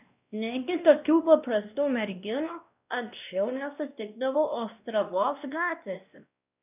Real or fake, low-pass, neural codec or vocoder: fake; 3.6 kHz; codec, 16 kHz, 1 kbps, X-Codec, WavLM features, trained on Multilingual LibriSpeech